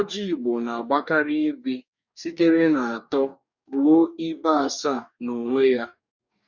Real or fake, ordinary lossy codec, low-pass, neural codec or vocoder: fake; none; 7.2 kHz; codec, 44.1 kHz, 2.6 kbps, DAC